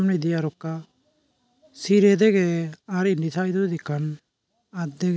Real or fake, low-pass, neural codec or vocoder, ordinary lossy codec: real; none; none; none